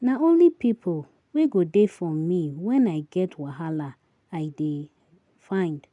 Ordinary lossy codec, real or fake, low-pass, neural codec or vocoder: none; real; 10.8 kHz; none